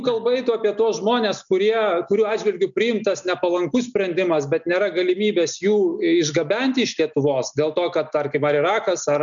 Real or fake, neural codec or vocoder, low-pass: real; none; 7.2 kHz